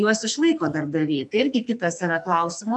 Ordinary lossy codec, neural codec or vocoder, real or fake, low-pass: MP3, 96 kbps; codec, 44.1 kHz, 2.6 kbps, SNAC; fake; 10.8 kHz